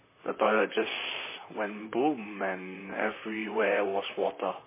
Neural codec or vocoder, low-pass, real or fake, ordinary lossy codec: vocoder, 44.1 kHz, 128 mel bands, Pupu-Vocoder; 3.6 kHz; fake; MP3, 16 kbps